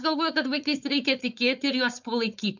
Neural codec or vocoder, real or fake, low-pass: codec, 16 kHz, 4.8 kbps, FACodec; fake; 7.2 kHz